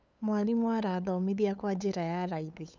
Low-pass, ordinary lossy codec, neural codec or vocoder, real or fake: none; none; codec, 16 kHz, 8 kbps, FunCodec, trained on LibriTTS, 25 frames a second; fake